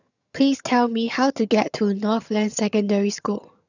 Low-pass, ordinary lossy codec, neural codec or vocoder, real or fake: 7.2 kHz; none; vocoder, 22.05 kHz, 80 mel bands, HiFi-GAN; fake